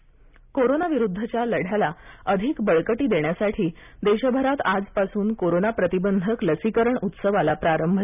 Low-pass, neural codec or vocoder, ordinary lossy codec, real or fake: 3.6 kHz; none; none; real